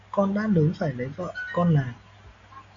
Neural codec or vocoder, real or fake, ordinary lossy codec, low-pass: none; real; AAC, 48 kbps; 7.2 kHz